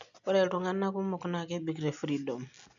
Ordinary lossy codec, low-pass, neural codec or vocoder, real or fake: none; 7.2 kHz; none; real